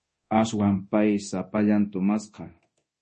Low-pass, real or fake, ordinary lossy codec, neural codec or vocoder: 10.8 kHz; fake; MP3, 32 kbps; codec, 24 kHz, 0.9 kbps, DualCodec